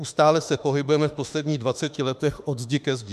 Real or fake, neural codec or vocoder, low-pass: fake; autoencoder, 48 kHz, 32 numbers a frame, DAC-VAE, trained on Japanese speech; 14.4 kHz